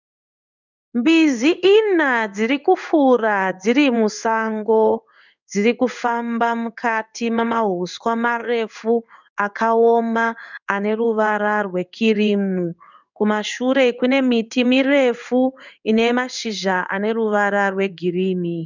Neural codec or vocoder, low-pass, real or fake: codec, 16 kHz in and 24 kHz out, 1 kbps, XY-Tokenizer; 7.2 kHz; fake